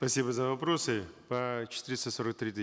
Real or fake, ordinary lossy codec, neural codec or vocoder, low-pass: real; none; none; none